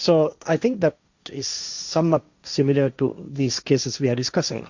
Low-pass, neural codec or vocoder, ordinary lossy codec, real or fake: 7.2 kHz; codec, 16 kHz, 1.1 kbps, Voila-Tokenizer; Opus, 64 kbps; fake